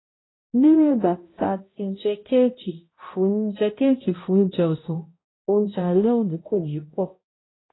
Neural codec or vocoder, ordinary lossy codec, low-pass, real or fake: codec, 16 kHz, 0.5 kbps, X-Codec, HuBERT features, trained on balanced general audio; AAC, 16 kbps; 7.2 kHz; fake